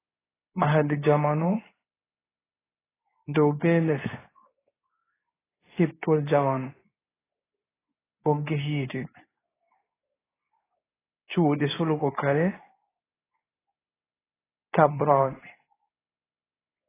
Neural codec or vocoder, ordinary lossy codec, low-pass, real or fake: codec, 24 kHz, 0.9 kbps, WavTokenizer, medium speech release version 2; AAC, 16 kbps; 3.6 kHz; fake